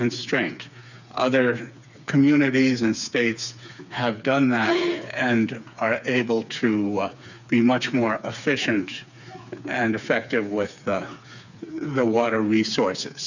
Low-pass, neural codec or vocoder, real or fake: 7.2 kHz; codec, 16 kHz, 4 kbps, FreqCodec, smaller model; fake